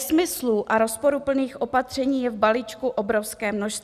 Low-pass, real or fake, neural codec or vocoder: 14.4 kHz; real; none